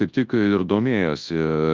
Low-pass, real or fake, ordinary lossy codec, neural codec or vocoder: 7.2 kHz; fake; Opus, 24 kbps; codec, 24 kHz, 0.9 kbps, WavTokenizer, large speech release